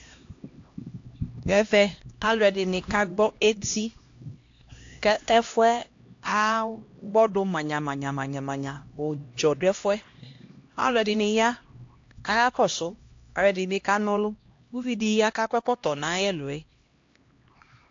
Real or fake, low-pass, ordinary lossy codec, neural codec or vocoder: fake; 7.2 kHz; AAC, 48 kbps; codec, 16 kHz, 1 kbps, X-Codec, HuBERT features, trained on LibriSpeech